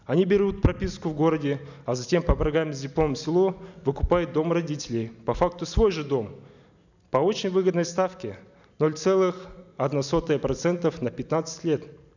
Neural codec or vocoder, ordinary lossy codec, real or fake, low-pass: none; none; real; 7.2 kHz